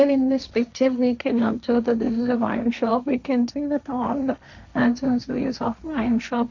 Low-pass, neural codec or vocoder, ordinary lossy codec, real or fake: 7.2 kHz; codec, 16 kHz, 1.1 kbps, Voila-Tokenizer; none; fake